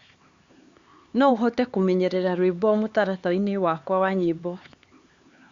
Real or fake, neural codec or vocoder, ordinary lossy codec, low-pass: fake; codec, 16 kHz, 4 kbps, X-Codec, HuBERT features, trained on LibriSpeech; Opus, 64 kbps; 7.2 kHz